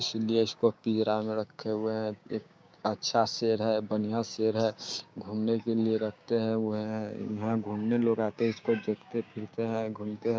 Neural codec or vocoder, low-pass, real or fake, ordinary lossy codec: codec, 16 kHz, 6 kbps, DAC; none; fake; none